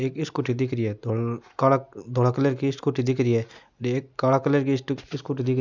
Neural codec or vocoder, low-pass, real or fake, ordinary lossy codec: none; 7.2 kHz; real; none